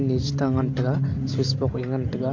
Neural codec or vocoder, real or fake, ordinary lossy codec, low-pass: none; real; MP3, 64 kbps; 7.2 kHz